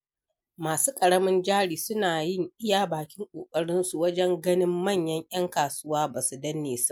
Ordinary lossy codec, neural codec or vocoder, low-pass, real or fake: MP3, 96 kbps; none; 19.8 kHz; real